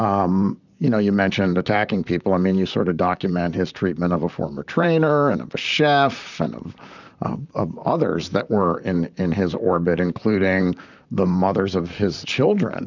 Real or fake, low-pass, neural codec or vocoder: fake; 7.2 kHz; vocoder, 44.1 kHz, 128 mel bands, Pupu-Vocoder